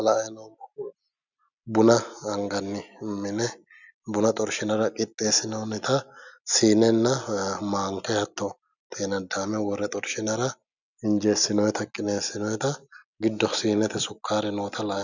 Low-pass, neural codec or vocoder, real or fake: 7.2 kHz; none; real